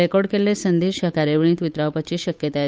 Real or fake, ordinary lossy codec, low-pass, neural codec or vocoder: fake; none; none; codec, 16 kHz, 8 kbps, FunCodec, trained on Chinese and English, 25 frames a second